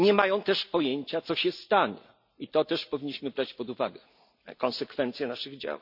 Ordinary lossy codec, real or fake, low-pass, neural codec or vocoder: none; real; 5.4 kHz; none